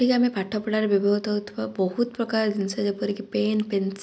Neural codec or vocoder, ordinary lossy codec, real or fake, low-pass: none; none; real; none